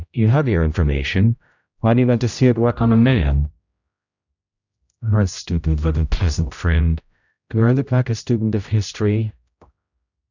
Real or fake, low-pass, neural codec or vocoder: fake; 7.2 kHz; codec, 16 kHz, 0.5 kbps, X-Codec, HuBERT features, trained on general audio